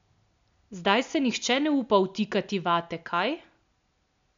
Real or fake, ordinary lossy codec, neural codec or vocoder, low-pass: real; MP3, 64 kbps; none; 7.2 kHz